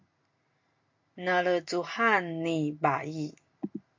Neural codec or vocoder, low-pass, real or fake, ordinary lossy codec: none; 7.2 kHz; real; MP3, 48 kbps